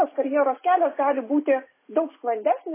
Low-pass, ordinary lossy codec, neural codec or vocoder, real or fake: 3.6 kHz; MP3, 16 kbps; none; real